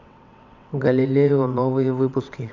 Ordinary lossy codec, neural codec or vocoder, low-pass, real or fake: none; vocoder, 22.05 kHz, 80 mel bands, WaveNeXt; 7.2 kHz; fake